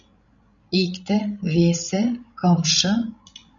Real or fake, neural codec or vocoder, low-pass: fake; codec, 16 kHz, 16 kbps, FreqCodec, larger model; 7.2 kHz